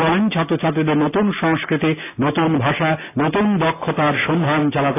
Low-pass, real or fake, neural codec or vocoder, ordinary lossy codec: 3.6 kHz; real; none; AAC, 16 kbps